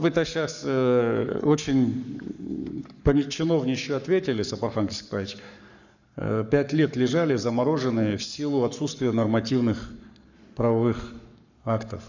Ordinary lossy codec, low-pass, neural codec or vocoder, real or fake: none; 7.2 kHz; codec, 44.1 kHz, 7.8 kbps, Pupu-Codec; fake